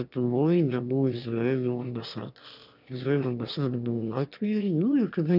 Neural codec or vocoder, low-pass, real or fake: autoencoder, 22.05 kHz, a latent of 192 numbers a frame, VITS, trained on one speaker; 5.4 kHz; fake